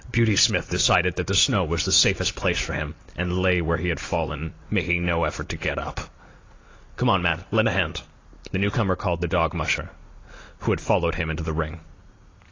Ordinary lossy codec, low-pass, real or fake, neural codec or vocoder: AAC, 32 kbps; 7.2 kHz; real; none